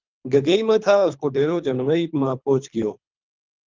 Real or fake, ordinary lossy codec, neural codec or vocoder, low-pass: fake; Opus, 32 kbps; codec, 24 kHz, 3 kbps, HILCodec; 7.2 kHz